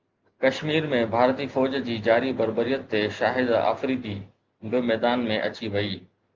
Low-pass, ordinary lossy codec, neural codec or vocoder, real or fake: 7.2 kHz; Opus, 32 kbps; none; real